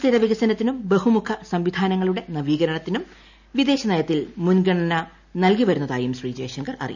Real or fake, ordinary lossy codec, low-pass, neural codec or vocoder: real; none; 7.2 kHz; none